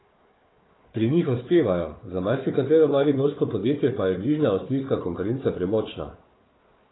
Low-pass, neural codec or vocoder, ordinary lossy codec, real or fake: 7.2 kHz; codec, 16 kHz, 4 kbps, FunCodec, trained on Chinese and English, 50 frames a second; AAC, 16 kbps; fake